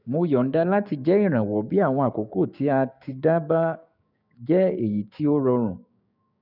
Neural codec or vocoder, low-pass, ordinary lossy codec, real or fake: codec, 16 kHz, 6 kbps, DAC; 5.4 kHz; none; fake